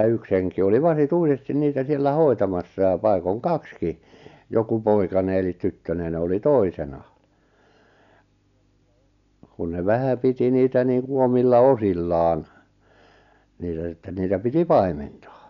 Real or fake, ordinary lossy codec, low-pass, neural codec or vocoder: real; none; 7.2 kHz; none